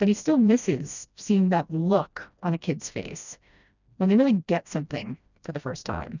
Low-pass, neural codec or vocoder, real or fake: 7.2 kHz; codec, 16 kHz, 1 kbps, FreqCodec, smaller model; fake